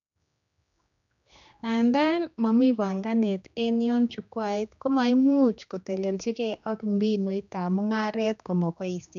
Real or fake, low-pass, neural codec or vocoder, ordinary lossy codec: fake; 7.2 kHz; codec, 16 kHz, 2 kbps, X-Codec, HuBERT features, trained on general audio; none